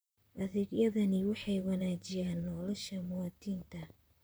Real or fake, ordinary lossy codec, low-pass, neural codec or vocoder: fake; none; none; vocoder, 44.1 kHz, 128 mel bands, Pupu-Vocoder